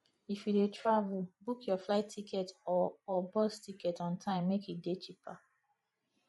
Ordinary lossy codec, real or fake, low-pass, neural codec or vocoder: MP3, 48 kbps; fake; 19.8 kHz; vocoder, 44.1 kHz, 128 mel bands every 512 samples, BigVGAN v2